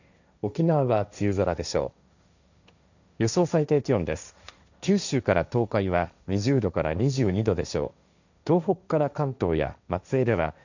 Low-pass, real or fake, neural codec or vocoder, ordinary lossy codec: 7.2 kHz; fake; codec, 16 kHz, 1.1 kbps, Voila-Tokenizer; none